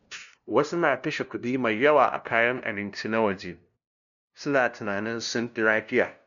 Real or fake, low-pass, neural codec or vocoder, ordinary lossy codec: fake; 7.2 kHz; codec, 16 kHz, 0.5 kbps, FunCodec, trained on LibriTTS, 25 frames a second; none